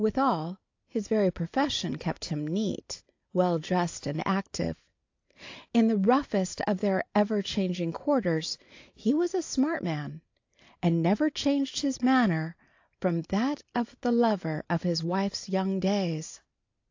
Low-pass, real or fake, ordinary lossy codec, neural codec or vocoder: 7.2 kHz; real; AAC, 48 kbps; none